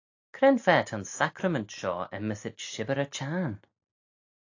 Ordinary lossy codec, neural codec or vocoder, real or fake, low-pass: AAC, 48 kbps; none; real; 7.2 kHz